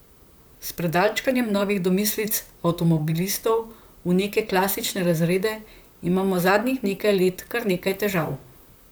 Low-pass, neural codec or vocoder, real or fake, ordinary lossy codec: none; vocoder, 44.1 kHz, 128 mel bands, Pupu-Vocoder; fake; none